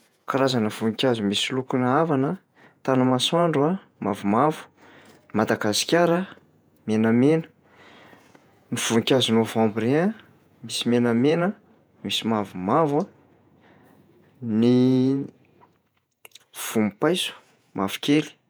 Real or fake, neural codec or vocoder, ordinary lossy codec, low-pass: fake; vocoder, 48 kHz, 128 mel bands, Vocos; none; none